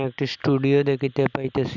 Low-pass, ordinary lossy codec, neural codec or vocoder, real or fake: 7.2 kHz; none; none; real